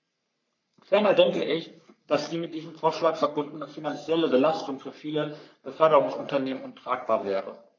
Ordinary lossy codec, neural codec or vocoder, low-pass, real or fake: none; codec, 44.1 kHz, 3.4 kbps, Pupu-Codec; 7.2 kHz; fake